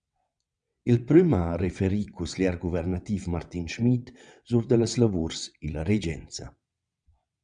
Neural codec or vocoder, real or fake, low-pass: vocoder, 22.05 kHz, 80 mel bands, WaveNeXt; fake; 9.9 kHz